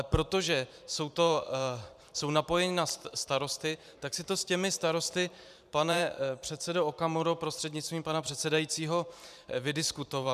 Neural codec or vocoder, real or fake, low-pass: vocoder, 44.1 kHz, 128 mel bands every 512 samples, BigVGAN v2; fake; 14.4 kHz